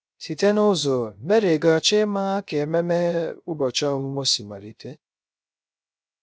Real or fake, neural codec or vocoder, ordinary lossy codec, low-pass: fake; codec, 16 kHz, 0.3 kbps, FocalCodec; none; none